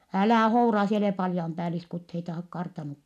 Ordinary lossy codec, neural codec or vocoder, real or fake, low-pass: none; none; real; 14.4 kHz